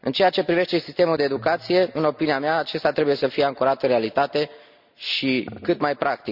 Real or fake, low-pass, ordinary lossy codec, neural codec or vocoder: real; 5.4 kHz; none; none